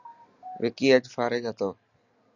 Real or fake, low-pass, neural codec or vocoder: real; 7.2 kHz; none